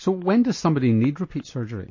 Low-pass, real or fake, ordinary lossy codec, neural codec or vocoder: 7.2 kHz; real; MP3, 32 kbps; none